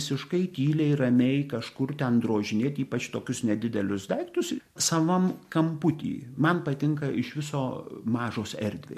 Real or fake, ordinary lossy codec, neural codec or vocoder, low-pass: real; MP3, 64 kbps; none; 14.4 kHz